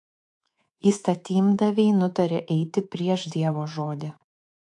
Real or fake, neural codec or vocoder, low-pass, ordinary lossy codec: fake; codec, 24 kHz, 3.1 kbps, DualCodec; 10.8 kHz; AAC, 64 kbps